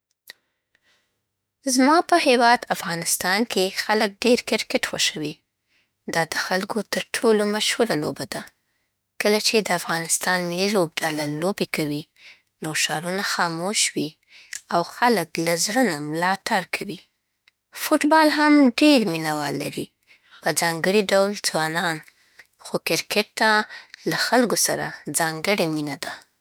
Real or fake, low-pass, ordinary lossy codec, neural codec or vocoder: fake; none; none; autoencoder, 48 kHz, 32 numbers a frame, DAC-VAE, trained on Japanese speech